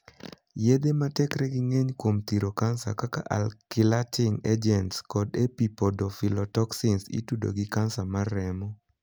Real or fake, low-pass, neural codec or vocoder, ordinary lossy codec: real; none; none; none